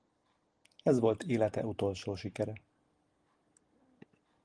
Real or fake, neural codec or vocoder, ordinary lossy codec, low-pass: real; none; Opus, 24 kbps; 9.9 kHz